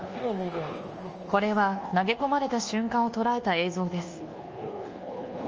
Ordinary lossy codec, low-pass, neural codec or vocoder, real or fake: Opus, 24 kbps; 7.2 kHz; codec, 24 kHz, 1.2 kbps, DualCodec; fake